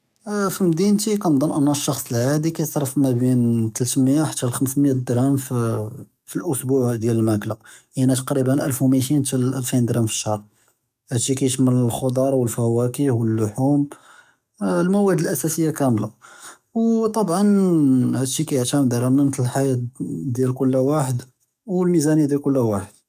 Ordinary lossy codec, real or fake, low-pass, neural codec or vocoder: none; fake; 14.4 kHz; codec, 44.1 kHz, 7.8 kbps, DAC